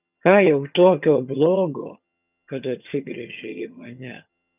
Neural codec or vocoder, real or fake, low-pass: vocoder, 22.05 kHz, 80 mel bands, HiFi-GAN; fake; 3.6 kHz